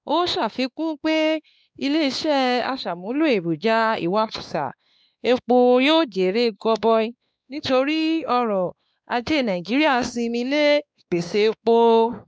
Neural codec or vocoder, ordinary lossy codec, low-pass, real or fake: codec, 16 kHz, 2 kbps, X-Codec, WavLM features, trained on Multilingual LibriSpeech; none; none; fake